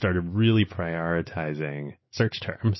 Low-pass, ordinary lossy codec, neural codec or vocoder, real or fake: 7.2 kHz; MP3, 24 kbps; none; real